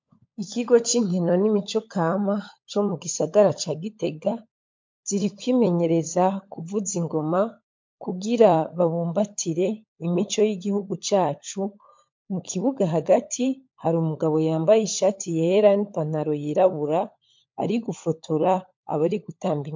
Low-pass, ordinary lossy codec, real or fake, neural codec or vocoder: 7.2 kHz; MP3, 48 kbps; fake; codec, 16 kHz, 16 kbps, FunCodec, trained on LibriTTS, 50 frames a second